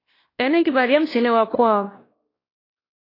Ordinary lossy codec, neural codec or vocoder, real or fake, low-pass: AAC, 24 kbps; codec, 16 kHz, 1 kbps, X-Codec, WavLM features, trained on Multilingual LibriSpeech; fake; 5.4 kHz